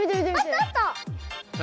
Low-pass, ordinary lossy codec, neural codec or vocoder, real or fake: none; none; none; real